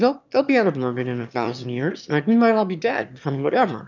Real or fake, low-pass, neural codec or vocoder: fake; 7.2 kHz; autoencoder, 22.05 kHz, a latent of 192 numbers a frame, VITS, trained on one speaker